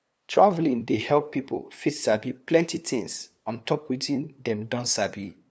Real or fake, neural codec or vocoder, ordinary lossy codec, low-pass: fake; codec, 16 kHz, 2 kbps, FunCodec, trained on LibriTTS, 25 frames a second; none; none